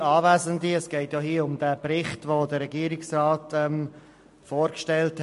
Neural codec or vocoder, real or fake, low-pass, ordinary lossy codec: none; real; 14.4 kHz; MP3, 48 kbps